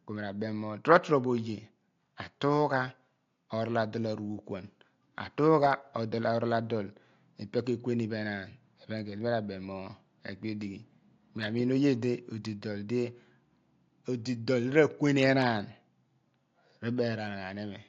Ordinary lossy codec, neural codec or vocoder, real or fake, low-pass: AAC, 48 kbps; none; real; 7.2 kHz